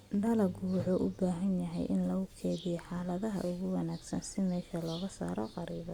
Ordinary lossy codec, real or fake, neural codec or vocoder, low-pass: Opus, 64 kbps; real; none; 19.8 kHz